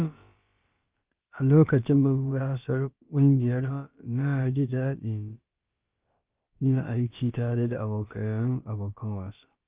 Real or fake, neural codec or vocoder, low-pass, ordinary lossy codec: fake; codec, 16 kHz, about 1 kbps, DyCAST, with the encoder's durations; 3.6 kHz; Opus, 24 kbps